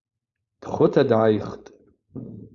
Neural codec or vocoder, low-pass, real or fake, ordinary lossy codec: codec, 16 kHz, 4.8 kbps, FACodec; 7.2 kHz; fake; Opus, 64 kbps